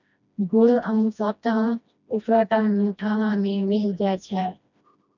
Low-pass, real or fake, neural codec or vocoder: 7.2 kHz; fake; codec, 16 kHz, 1 kbps, FreqCodec, smaller model